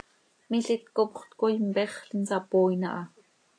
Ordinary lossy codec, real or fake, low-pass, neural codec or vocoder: AAC, 48 kbps; real; 9.9 kHz; none